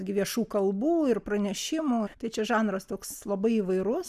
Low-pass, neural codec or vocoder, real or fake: 14.4 kHz; none; real